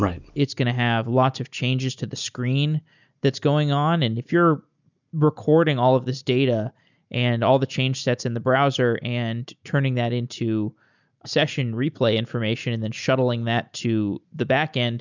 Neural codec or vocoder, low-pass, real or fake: none; 7.2 kHz; real